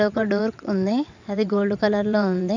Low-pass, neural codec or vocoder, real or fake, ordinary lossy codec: 7.2 kHz; vocoder, 22.05 kHz, 80 mel bands, WaveNeXt; fake; none